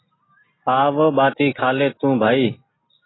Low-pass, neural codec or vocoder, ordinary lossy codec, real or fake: 7.2 kHz; none; AAC, 16 kbps; real